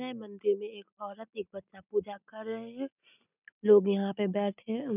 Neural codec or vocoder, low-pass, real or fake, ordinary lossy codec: none; 3.6 kHz; real; none